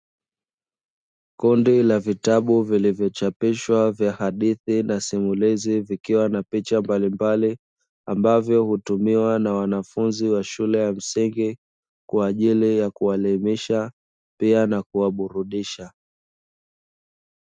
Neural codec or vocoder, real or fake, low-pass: none; real; 9.9 kHz